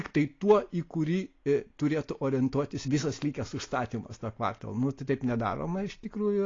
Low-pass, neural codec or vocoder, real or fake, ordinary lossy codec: 7.2 kHz; none; real; AAC, 32 kbps